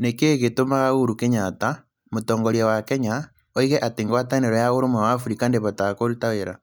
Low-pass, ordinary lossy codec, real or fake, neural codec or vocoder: none; none; real; none